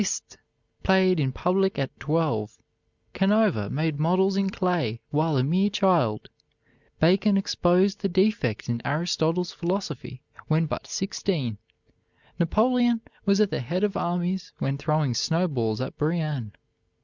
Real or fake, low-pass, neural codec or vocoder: real; 7.2 kHz; none